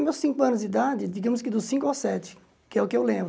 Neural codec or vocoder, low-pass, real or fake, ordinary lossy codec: none; none; real; none